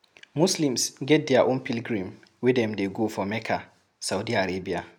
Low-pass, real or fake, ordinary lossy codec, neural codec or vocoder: 19.8 kHz; real; none; none